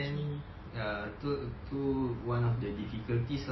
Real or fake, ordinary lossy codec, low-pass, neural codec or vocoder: real; MP3, 24 kbps; 7.2 kHz; none